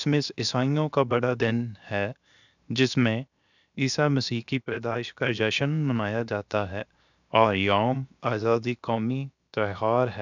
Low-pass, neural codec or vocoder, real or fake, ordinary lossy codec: 7.2 kHz; codec, 16 kHz, 0.7 kbps, FocalCodec; fake; none